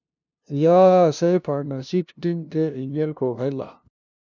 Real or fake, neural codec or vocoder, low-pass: fake; codec, 16 kHz, 0.5 kbps, FunCodec, trained on LibriTTS, 25 frames a second; 7.2 kHz